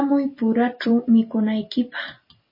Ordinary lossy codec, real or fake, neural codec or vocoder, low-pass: MP3, 24 kbps; fake; vocoder, 44.1 kHz, 128 mel bands every 256 samples, BigVGAN v2; 5.4 kHz